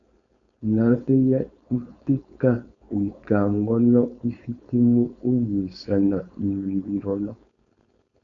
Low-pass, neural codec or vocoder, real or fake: 7.2 kHz; codec, 16 kHz, 4.8 kbps, FACodec; fake